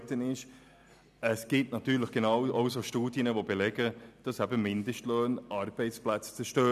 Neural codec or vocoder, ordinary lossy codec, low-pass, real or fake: none; none; 14.4 kHz; real